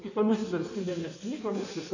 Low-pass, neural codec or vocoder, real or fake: 7.2 kHz; codec, 16 kHz in and 24 kHz out, 1.1 kbps, FireRedTTS-2 codec; fake